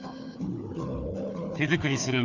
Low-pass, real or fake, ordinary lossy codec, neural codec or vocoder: 7.2 kHz; fake; Opus, 64 kbps; codec, 16 kHz, 4 kbps, FunCodec, trained on Chinese and English, 50 frames a second